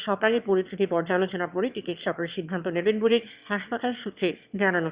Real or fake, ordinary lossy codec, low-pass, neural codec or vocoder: fake; Opus, 64 kbps; 3.6 kHz; autoencoder, 22.05 kHz, a latent of 192 numbers a frame, VITS, trained on one speaker